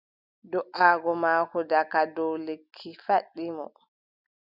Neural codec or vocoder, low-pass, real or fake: none; 5.4 kHz; real